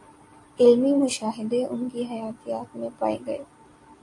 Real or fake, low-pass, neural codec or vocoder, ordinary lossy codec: real; 10.8 kHz; none; AAC, 48 kbps